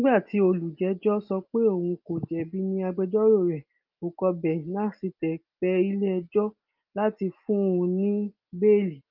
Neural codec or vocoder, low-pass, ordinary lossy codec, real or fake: none; 5.4 kHz; Opus, 24 kbps; real